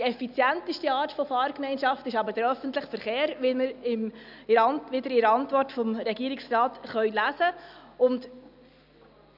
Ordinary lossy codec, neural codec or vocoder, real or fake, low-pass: none; none; real; 5.4 kHz